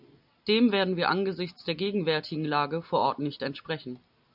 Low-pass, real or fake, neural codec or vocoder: 5.4 kHz; real; none